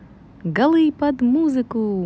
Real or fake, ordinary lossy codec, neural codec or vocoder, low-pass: real; none; none; none